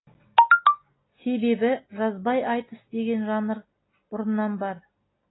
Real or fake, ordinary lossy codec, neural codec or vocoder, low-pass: real; AAC, 16 kbps; none; 7.2 kHz